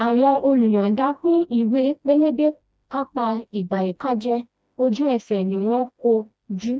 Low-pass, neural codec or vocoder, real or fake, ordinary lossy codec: none; codec, 16 kHz, 1 kbps, FreqCodec, smaller model; fake; none